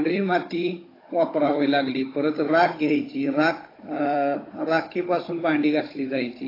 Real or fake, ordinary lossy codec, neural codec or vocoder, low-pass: fake; AAC, 24 kbps; codec, 16 kHz, 16 kbps, FunCodec, trained on Chinese and English, 50 frames a second; 5.4 kHz